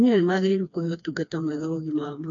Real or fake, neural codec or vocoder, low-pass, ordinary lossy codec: fake; codec, 16 kHz, 2 kbps, FreqCodec, smaller model; 7.2 kHz; none